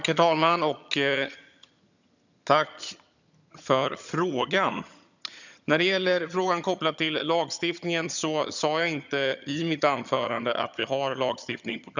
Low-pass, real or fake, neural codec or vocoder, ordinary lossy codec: 7.2 kHz; fake; vocoder, 22.05 kHz, 80 mel bands, HiFi-GAN; none